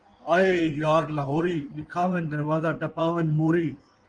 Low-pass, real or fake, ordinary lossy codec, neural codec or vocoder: 9.9 kHz; fake; Opus, 24 kbps; codec, 16 kHz in and 24 kHz out, 1.1 kbps, FireRedTTS-2 codec